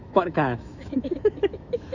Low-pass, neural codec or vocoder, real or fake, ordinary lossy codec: 7.2 kHz; autoencoder, 48 kHz, 128 numbers a frame, DAC-VAE, trained on Japanese speech; fake; none